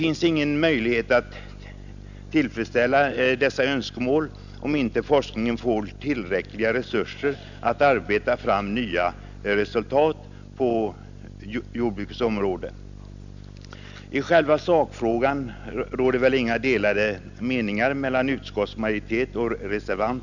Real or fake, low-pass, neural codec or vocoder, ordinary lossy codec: real; 7.2 kHz; none; none